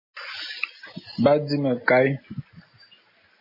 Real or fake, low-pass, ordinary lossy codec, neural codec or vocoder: real; 5.4 kHz; MP3, 24 kbps; none